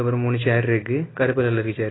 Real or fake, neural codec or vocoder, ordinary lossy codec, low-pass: real; none; AAC, 16 kbps; 7.2 kHz